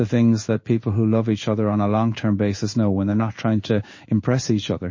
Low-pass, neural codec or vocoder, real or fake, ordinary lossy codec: 7.2 kHz; codec, 16 kHz in and 24 kHz out, 1 kbps, XY-Tokenizer; fake; MP3, 32 kbps